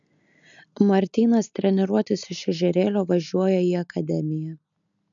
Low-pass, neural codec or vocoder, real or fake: 7.2 kHz; none; real